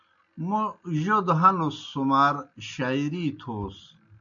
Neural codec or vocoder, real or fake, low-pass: none; real; 7.2 kHz